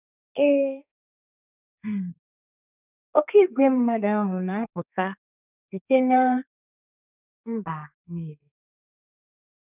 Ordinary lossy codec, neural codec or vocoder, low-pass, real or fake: none; codec, 32 kHz, 1.9 kbps, SNAC; 3.6 kHz; fake